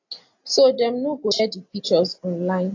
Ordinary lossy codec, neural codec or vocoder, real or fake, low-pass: AAC, 48 kbps; none; real; 7.2 kHz